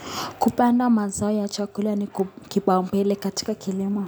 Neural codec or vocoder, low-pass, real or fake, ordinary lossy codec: none; none; real; none